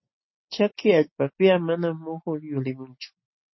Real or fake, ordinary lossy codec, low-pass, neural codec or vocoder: real; MP3, 24 kbps; 7.2 kHz; none